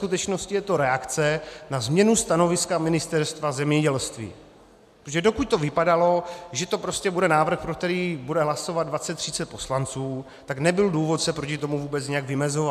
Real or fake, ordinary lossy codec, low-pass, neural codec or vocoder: real; MP3, 96 kbps; 14.4 kHz; none